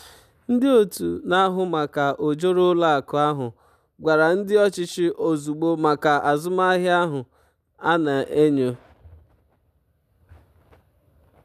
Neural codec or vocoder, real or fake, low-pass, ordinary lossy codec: none; real; 14.4 kHz; none